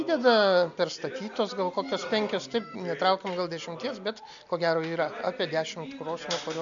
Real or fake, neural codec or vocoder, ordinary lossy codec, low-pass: real; none; AAC, 64 kbps; 7.2 kHz